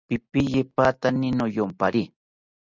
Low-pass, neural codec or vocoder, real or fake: 7.2 kHz; none; real